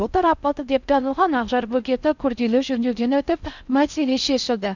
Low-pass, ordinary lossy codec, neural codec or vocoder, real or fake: 7.2 kHz; none; codec, 16 kHz in and 24 kHz out, 0.6 kbps, FocalCodec, streaming, 4096 codes; fake